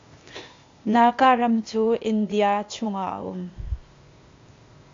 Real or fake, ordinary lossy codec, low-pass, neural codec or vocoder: fake; AAC, 48 kbps; 7.2 kHz; codec, 16 kHz, 0.8 kbps, ZipCodec